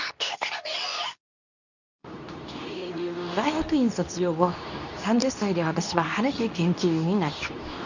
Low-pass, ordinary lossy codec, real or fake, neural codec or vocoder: 7.2 kHz; none; fake; codec, 24 kHz, 0.9 kbps, WavTokenizer, medium speech release version 2